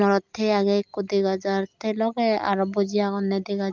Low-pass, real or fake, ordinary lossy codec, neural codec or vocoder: 7.2 kHz; real; Opus, 24 kbps; none